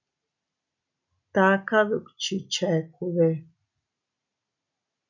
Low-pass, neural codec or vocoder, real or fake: 7.2 kHz; none; real